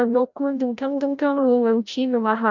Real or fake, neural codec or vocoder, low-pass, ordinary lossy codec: fake; codec, 16 kHz, 0.5 kbps, FreqCodec, larger model; 7.2 kHz; none